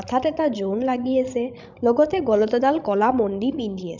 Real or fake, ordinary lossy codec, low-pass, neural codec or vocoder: fake; none; 7.2 kHz; codec, 16 kHz, 16 kbps, FreqCodec, larger model